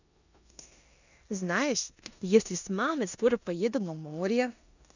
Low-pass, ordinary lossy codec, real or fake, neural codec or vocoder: 7.2 kHz; none; fake; codec, 16 kHz in and 24 kHz out, 0.9 kbps, LongCat-Audio-Codec, four codebook decoder